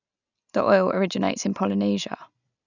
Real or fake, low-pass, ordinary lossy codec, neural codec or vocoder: real; 7.2 kHz; none; none